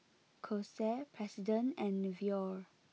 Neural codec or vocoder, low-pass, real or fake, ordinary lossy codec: none; none; real; none